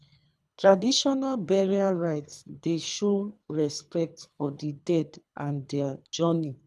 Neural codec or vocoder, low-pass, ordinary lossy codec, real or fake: codec, 24 kHz, 3 kbps, HILCodec; none; none; fake